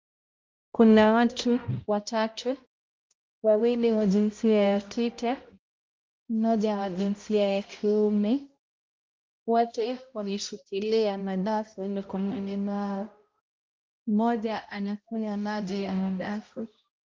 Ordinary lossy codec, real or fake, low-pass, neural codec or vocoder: Opus, 32 kbps; fake; 7.2 kHz; codec, 16 kHz, 0.5 kbps, X-Codec, HuBERT features, trained on balanced general audio